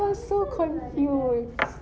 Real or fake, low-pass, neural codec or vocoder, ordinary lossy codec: real; none; none; none